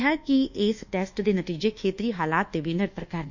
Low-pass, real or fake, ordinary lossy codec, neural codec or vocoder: 7.2 kHz; fake; none; codec, 24 kHz, 1.2 kbps, DualCodec